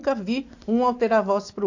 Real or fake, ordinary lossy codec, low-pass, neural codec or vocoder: real; AAC, 48 kbps; 7.2 kHz; none